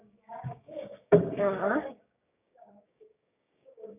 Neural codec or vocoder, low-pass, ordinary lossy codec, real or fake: codec, 16 kHz, 6 kbps, DAC; 3.6 kHz; none; fake